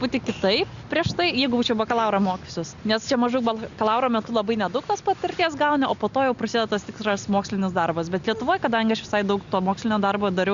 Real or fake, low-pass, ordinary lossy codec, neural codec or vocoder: real; 7.2 kHz; Opus, 64 kbps; none